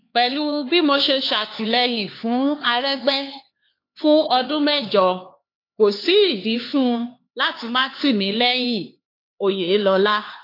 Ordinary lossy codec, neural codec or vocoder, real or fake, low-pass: AAC, 32 kbps; codec, 16 kHz, 4 kbps, X-Codec, HuBERT features, trained on LibriSpeech; fake; 5.4 kHz